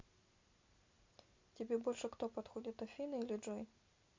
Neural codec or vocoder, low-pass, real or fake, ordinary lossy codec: none; 7.2 kHz; real; MP3, 48 kbps